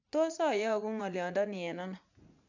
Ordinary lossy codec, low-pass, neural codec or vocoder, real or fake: none; 7.2 kHz; vocoder, 44.1 kHz, 80 mel bands, Vocos; fake